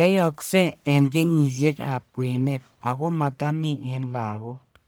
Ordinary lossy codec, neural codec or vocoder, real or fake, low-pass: none; codec, 44.1 kHz, 1.7 kbps, Pupu-Codec; fake; none